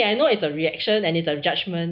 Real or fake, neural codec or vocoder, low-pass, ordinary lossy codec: real; none; 5.4 kHz; none